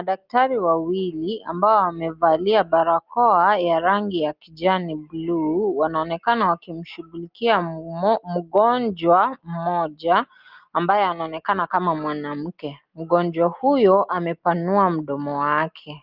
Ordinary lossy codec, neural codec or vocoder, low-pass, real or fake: Opus, 24 kbps; none; 5.4 kHz; real